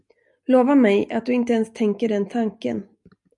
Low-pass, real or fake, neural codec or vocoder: 10.8 kHz; real; none